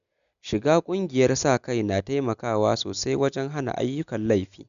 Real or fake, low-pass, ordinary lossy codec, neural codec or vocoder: real; 7.2 kHz; none; none